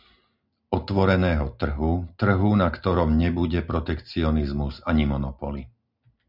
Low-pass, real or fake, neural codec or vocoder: 5.4 kHz; real; none